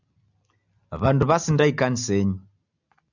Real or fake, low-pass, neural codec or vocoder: real; 7.2 kHz; none